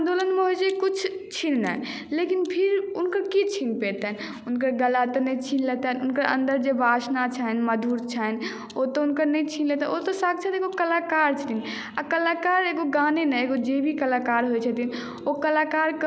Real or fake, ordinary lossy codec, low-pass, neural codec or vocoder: real; none; none; none